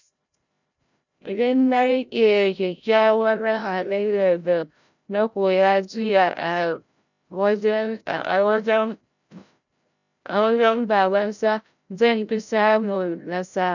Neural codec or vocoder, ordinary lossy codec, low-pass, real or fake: codec, 16 kHz, 0.5 kbps, FreqCodec, larger model; none; 7.2 kHz; fake